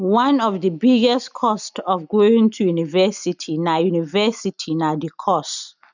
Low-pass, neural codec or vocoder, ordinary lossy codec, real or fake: 7.2 kHz; none; none; real